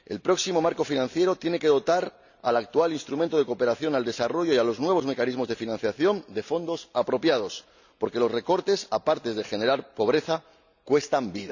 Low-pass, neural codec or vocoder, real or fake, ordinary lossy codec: 7.2 kHz; none; real; none